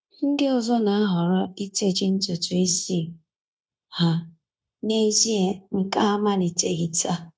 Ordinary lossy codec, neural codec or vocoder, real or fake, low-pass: none; codec, 16 kHz, 0.9 kbps, LongCat-Audio-Codec; fake; none